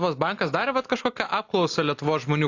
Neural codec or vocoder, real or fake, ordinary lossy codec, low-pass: none; real; AAC, 48 kbps; 7.2 kHz